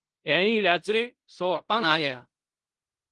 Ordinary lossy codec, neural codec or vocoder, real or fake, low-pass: Opus, 24 kbps; codec, 16 kHz in and 24 kHz out, 0.4 kbps, LongCat-Audio-Codec, fine tuned four codebook decoder; fake; 10.8 kHz